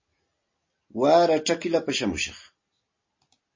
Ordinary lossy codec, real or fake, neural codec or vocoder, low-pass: MP3, 32 kbps; real; none; 7.2 kHz